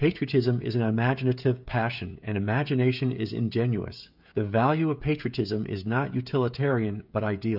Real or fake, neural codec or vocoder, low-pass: fake; codec, 16 kHz, 16 kbps, FreqCodec, smaller model; 5.4 kHz